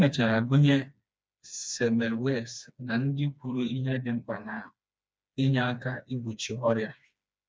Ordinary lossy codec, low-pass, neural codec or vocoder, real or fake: none; none; codec, 16 kHz, 2 kbps, FreqCodec, smaller model; fake